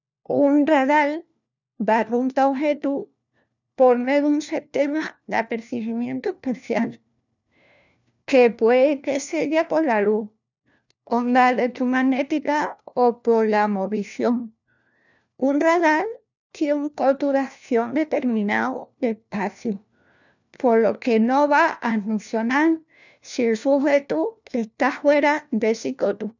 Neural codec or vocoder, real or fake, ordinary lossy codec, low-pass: codec, 16 kHz, 1 kbps, FunCodec, trained on LibriTTS, 50 frames a second; fake; none; 7.2 kHz